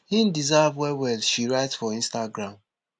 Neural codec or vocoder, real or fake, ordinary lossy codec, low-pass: none; real; none; none